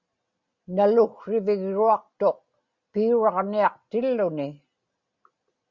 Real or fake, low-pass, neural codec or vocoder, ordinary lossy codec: real; 7.2 kHz; none; Opus, 64 kbps